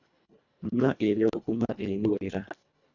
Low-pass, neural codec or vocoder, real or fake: 7.2 kHz; codec, 24 kHz, 1.5 kbps, HILCodec; fake